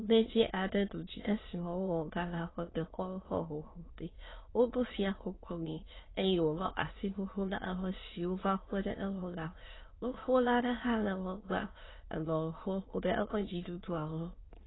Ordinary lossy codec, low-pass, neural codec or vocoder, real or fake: AAC, 16 kbps; 7.2 kHz; autoencoder, 22.05 kHz, a latent of 192 numbers a frame, VITS, trained on many speakers; fake